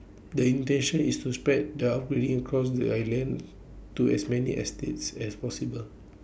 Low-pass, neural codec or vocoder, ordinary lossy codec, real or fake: none; none; none; real